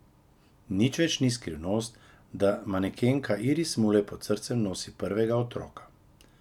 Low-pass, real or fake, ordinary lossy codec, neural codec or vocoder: 19.8 kHz; real; none; none